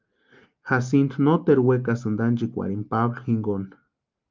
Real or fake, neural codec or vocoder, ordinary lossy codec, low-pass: real; none; Opus, 24 kbps; 7.2 kHz